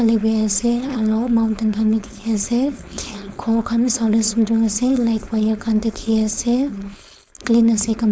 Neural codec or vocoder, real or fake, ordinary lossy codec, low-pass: codec, 16 kHz, 4.8 kbps, FACodec; fake; none; none